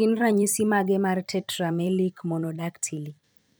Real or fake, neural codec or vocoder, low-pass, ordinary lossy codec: fake; vocoder, 44.1 kHz, 128 mel bands every 256 samples, BigVGAN v2; none; none